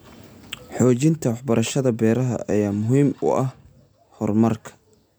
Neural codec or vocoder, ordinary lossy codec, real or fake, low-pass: none; none; real; none